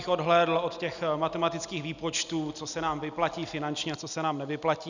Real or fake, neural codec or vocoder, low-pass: real; none; 7.2 kHz